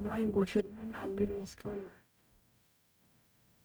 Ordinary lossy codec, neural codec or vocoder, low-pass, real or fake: none; codec, 44.1 kHz, 0.9 kbps, DAC; none; fake